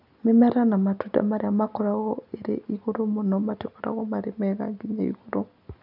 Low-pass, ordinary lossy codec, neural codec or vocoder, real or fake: 5.4 kHz; none; none; real